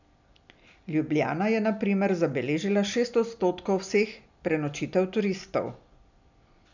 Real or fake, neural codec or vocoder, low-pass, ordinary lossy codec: real; none; 7.2 kHz; none